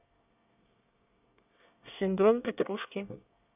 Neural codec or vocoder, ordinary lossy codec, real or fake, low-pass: codec, 24 kHz, 1 kbps, SNAC; none; fake; 3.6 kHz